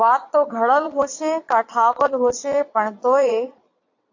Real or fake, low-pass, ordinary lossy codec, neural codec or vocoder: fake; 7.2 kHz; AAC, 48 kbps; vocoder, 22.05 kHz, 80 mel bands, Vocos